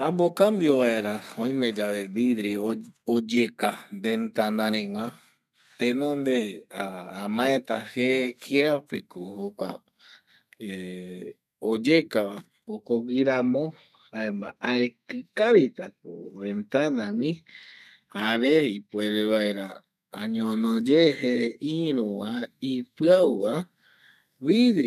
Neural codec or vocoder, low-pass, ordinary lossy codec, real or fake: codec, 32 kHz, 1.9 kbps, SNAC; 14.4 kHz; none; fake